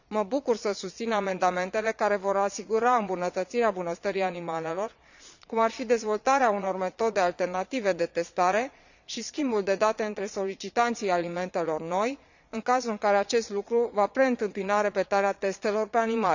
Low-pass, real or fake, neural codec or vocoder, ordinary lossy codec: 7.2 kHz; fake; vocoder, 22.05 kHz, 80 mel bands, Vocos; none